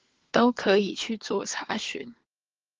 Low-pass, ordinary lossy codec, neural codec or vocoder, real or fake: 7.2 kHz; Opus, 16 kbps; codec, 16 kHz, 4 kbps, FunCodec, trained on LibriTTS, 50 frames a second; fake